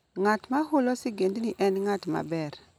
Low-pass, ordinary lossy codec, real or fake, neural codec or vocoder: 19.8 kHz; none; real; none